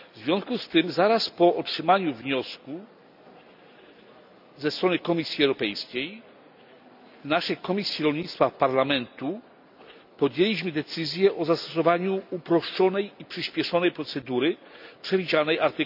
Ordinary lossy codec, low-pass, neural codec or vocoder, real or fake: none; 5.4 kHz; none; real